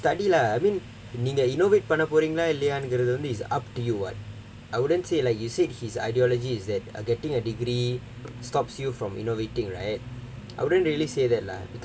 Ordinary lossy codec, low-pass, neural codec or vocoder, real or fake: none; none; none; real